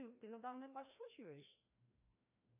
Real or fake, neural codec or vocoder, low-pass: fake; codec, 16 kHz, 1 kbps, FreqCodec, larger model; 3.6 kHz